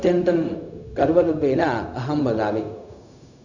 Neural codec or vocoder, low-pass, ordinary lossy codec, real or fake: codec, 16 kHz, 0.4 kbps, LongCat-Audio-Codec; 7.2 kHz; none; fake